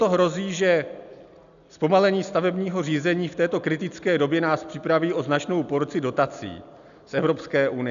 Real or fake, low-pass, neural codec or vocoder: real; 7.2 kHz; none